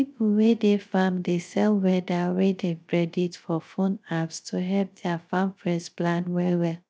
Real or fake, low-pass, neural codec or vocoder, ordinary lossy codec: fake; none; codec, 16 kHz, 0.3 kbps, FocalCodec; none